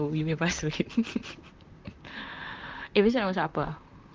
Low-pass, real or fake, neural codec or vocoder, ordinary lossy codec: 7.2 kHz; fake; vocoder, 44.1 kHz, 128 mel bands, Pupu-Vocoder; Opus, 32 kbps